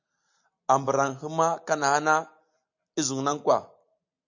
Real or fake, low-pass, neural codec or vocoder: real; 7.2 kHz; none